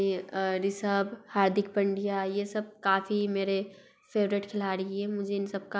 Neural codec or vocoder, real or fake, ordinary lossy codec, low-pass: none; real; none; none